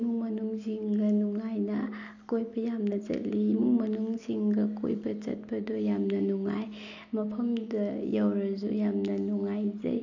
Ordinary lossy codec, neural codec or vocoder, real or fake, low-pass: none; none; real; 7.2 kHz